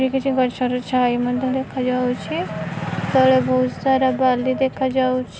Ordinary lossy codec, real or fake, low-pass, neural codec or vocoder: none; real; none; none